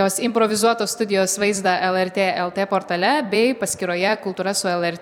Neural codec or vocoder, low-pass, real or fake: vocoder, 44.1 kHz, 128 mel bands every 256 samples, BigVGAN v2; 19.8 kHz; fake